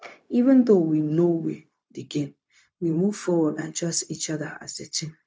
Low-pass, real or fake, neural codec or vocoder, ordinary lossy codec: none; fake; codec, 16 kHz, 0.4 kbps, LongCat-Audio-Codec; none